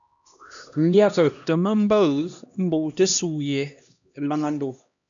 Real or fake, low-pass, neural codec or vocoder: fake; 7.2 kHz; codec, 16 kHz, 1 kbps, X-Codec, HuBERT features, trained on LibriSpeech